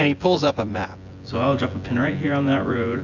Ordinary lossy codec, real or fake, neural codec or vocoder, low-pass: AAC, 48 kbps; fake; vocoder, 24 kHz, 100 mel bands, Vocos; 7.2 kHz